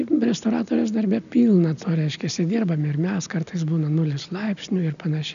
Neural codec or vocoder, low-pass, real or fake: none; 7.2 kHz; real